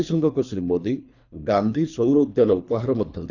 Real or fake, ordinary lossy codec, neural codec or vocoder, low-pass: fake; none; codec, 24 kHz, 3 kbps, HILCodec; 7.2 kHz